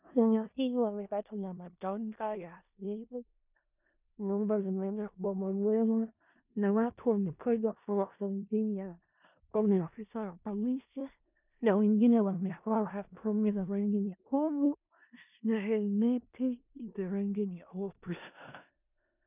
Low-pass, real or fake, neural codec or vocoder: 3.6 kHz; fake; codec, 16 kHz in and 24 kHz out, 0.4 kbps, LongCat-Audio-Codec, four codebook decoder